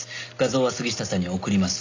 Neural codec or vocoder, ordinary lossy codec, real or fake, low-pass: none; AAC, 48 kbps; real; 7.2 kHz